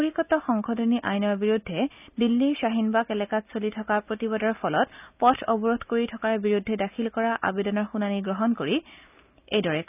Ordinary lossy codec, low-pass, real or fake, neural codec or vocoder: none; 3.6 kHz; real; none